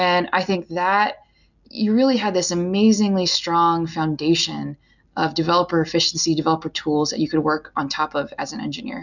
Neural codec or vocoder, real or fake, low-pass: none; real; 7.2 kHz